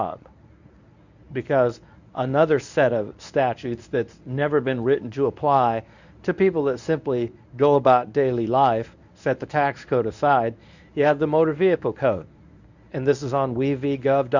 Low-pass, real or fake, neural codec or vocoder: 7.2 kHz; fake; codec, 24 kHz, 0.9 kbps, WavTokenizer, medium speech release version 1